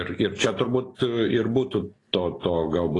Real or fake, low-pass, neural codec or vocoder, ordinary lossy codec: real; 10.8 kHz; none; AAC, 32 kbps